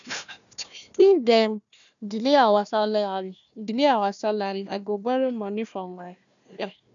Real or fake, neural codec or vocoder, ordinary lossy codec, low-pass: fake; codec, 16 kHz, 1 kbps, FunCodec, trained on Chinese and English, 50 frames a second; none; 7.2 kHz